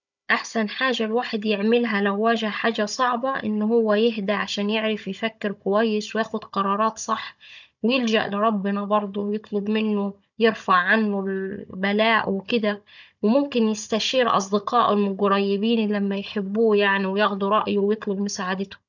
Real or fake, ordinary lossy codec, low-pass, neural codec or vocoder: fake; none; 7.2 kHz; codec, 16 kHz, 16 kbps, FunCodec, trained on Chinese and English, 50 frames a second